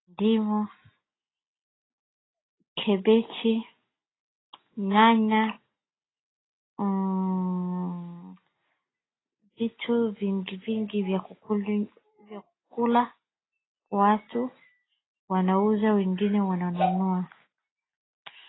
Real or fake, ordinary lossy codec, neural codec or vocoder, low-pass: real; AAC, 16 kbps; none; 7.2 kHz